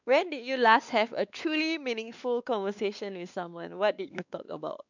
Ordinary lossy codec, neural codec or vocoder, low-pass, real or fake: none; codec, 16 kHz, 2 kbps, X-Codec, WavLM features, trained on Multilingual LibriSpeech; 7.2 kHz; fake